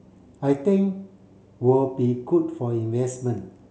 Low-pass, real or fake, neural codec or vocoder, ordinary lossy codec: none; real; none; none